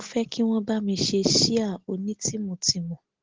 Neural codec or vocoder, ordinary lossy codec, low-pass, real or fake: none; Opus, 16 kbps; 7.2 kHz; real